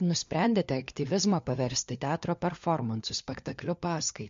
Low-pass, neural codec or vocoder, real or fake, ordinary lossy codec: 7.2 kHz; codec, 16 kHz, 4 kbps, FunCodec, trained on LibriTTS, 50 frames a second; fake; MP3, 48 kbps